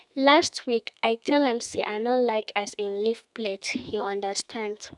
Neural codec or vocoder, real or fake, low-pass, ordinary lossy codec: codec, 32 kHz, 1.9 kbps, SNAC; fake; 10.8 kHz; none